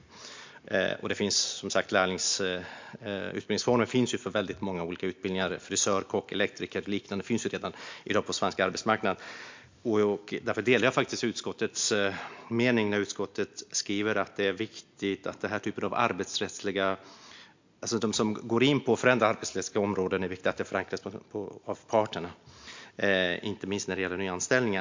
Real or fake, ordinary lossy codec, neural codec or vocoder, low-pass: real; MP3, 64 kbps; none; 7.2 kHz